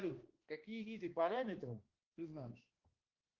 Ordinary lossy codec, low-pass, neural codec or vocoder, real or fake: Opus, 16 kbps; 7.2 kHz; codec, 16 kHz, 1 kbps, X-Codec, HuBERT features, trained on balanced general audio; fake